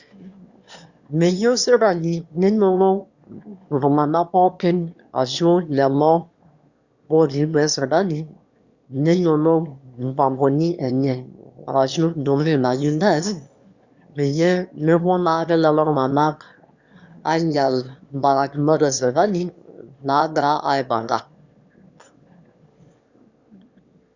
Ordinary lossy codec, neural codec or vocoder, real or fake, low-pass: Opus, 64 kbps; autoencoder, 22.05 kHz, a latent of 192 numbers a frame, VITS, trained on one speaker; fake; 7.2 kHz